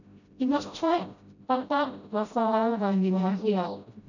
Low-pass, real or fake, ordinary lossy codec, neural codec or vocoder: 7.2 kHz; fake; none; codec, 16 kHz, 0.5 kbps, FreqCodec, smaller model